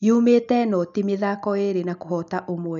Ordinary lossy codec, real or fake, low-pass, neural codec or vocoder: none; real; 7.2 kHz; none